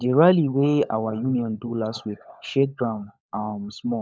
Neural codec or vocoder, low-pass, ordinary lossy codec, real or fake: codec, 16 kHz, 16 kbps, FunCodec, trained on LibriTTS, 50 frames a second; none; none; fake